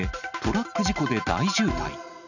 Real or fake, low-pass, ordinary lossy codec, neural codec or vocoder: real; 7.2 kHz; none; none